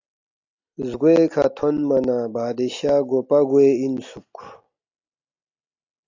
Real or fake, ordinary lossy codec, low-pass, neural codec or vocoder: real; AAC, 48 kbps; 7.2 kHz; none